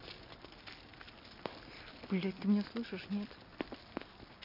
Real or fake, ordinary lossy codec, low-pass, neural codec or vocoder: real; none; 5.4 kHz; none